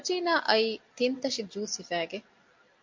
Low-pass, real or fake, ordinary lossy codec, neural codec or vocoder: 7.2 kHz; real; MP3, 48 kbps; none